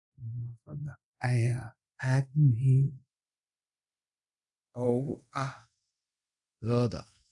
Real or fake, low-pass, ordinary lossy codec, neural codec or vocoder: fake; 10.8 kHz; none; codec, 24 kHz, 0.5 kbps, DualCodec